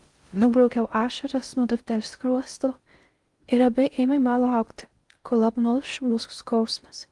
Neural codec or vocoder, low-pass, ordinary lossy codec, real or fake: codec, 16 kHz in and 24 kHz out, 0.6 kbps, FocalCodec, streaming, 2048 codes; 10.8 kHz; Opus, 24 kbps; fake